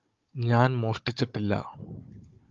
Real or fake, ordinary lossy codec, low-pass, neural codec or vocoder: fake; Opus, 32 kbps; 7.2 kHz; codec, 16 kHz, 16 kbps, FunCodec, trained on Chinese and English, 50 frames a second